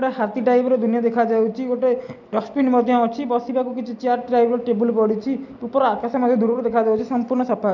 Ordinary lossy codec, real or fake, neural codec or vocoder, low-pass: none; real; none; 7.2 kHz